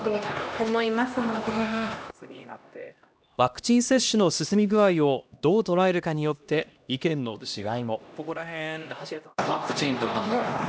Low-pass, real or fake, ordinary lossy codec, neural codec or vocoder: none; fake; none; codec, 16 kHz, 1 kbps, X-Codec, HuBERT features, trained on LibriSpeech